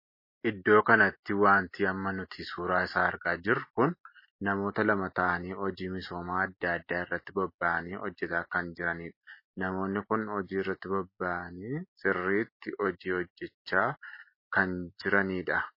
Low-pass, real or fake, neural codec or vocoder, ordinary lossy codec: 5.4 kHz; real; none; MP3, 24 kbps